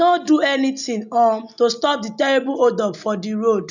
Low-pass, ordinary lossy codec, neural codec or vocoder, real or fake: 7.2 kHz; none; none; real